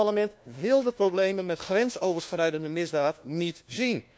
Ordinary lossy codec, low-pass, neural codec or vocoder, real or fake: none; none; codec, 16 kHz, 1 kbps, FunCodec, trained on LibriTTS, 50 frames a second; fake